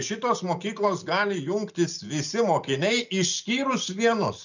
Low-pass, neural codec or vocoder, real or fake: 7.2 kHz; none; real